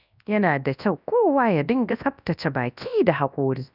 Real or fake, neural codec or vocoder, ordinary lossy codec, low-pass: fake; codec, 24 kHz, 0.9 kbps, WavTokenizer, large speech release; none; 5.4 kHz